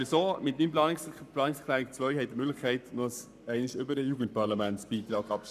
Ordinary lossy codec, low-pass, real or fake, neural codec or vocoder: none; 14.4 kHz; fake; codec, 44.1 kHz, 7.8 kbps, Pupu-Codec